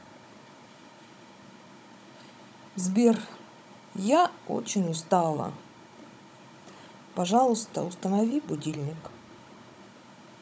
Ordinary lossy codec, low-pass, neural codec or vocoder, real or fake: none; none; codec, 16 kHz, 16 kbps, FunCodec, trained on Chinese and English, 50 frames a second; fake